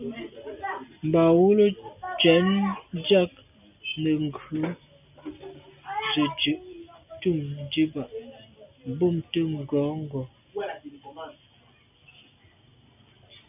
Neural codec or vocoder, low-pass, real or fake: none; 3.6 kHz; real